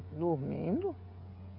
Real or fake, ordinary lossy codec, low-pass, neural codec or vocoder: fake; none; 5.4 kHz; codec, 16 kHz, 16 kbps, FreqCodec, smaller model